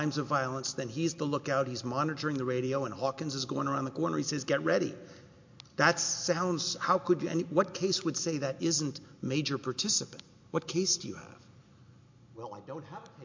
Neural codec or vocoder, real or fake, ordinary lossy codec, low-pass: none; real; MP3, 48 kbps; 7.2 kHz